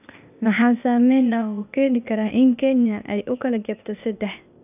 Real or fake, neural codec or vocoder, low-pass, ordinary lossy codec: fake; codec, 16 kHz, 0.8 kbps, ZipCodec; 3.6 kHz; none